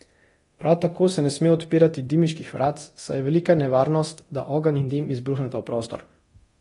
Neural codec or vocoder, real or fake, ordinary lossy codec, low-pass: codec, 24 kHz, 0.9 kbps, DualCodec; fake; AAC, 32 kbps; 10.8 kHz